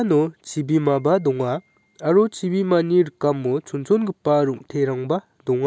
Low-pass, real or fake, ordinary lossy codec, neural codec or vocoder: none; real; none; none